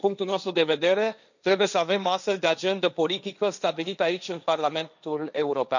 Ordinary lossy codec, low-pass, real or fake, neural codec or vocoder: none; 7.2 kHz; fake; codec, 16 kHz, 1.1 kbps, Voila-Tokenizer